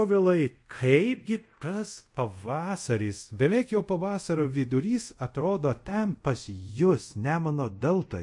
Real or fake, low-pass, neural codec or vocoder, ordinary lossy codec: fake; 10.8 kHz; codec, 24 kHz, 0.5 kbps, DualCodec; MP3, 48 kbps